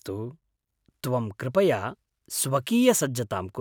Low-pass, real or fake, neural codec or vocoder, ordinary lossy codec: none; real; none; none